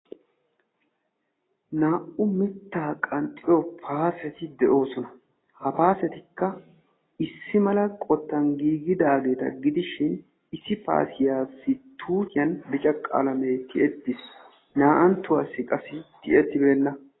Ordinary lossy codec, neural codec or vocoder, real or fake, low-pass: AAC, 16 kbps; none; real; 7.2 kHz